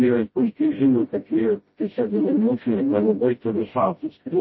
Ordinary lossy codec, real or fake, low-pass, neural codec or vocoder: MP3, 24 kbps; fake; 7.2 kHz; codec, 16 kHz, 0.5 kbps, FreqCodec, smaller model